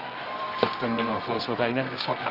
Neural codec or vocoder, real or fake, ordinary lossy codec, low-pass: codec, 24 kHz, 0.9 kbps, WavTokenizer, medium music audio release; fake; Opus, 24 kbps; 5.4 kHz